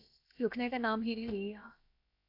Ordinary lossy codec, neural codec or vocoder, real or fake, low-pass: AAC, 32 kbps; codec, 16 kHz, about 1 kbps, DyCAST, with the encoder's durations; fake; 5.4 kHz